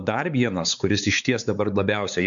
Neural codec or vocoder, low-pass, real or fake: codec, 16 kHz, 4 kbps, X-Codec, WavLM features, trained on Multilingual LibriSpeech; 7.2 kHz; fake